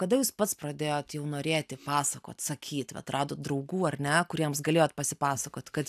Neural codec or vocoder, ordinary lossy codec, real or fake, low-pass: none; AAC, 96 kbps; real; 14.4 kHz